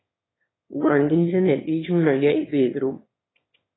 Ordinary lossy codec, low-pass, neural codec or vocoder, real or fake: AAC, 16 kbps; 7.2 kHz; autoencoder, 22.05 kHz, a latent of 192 numbers a frame, VITS, trained on one speaker; fake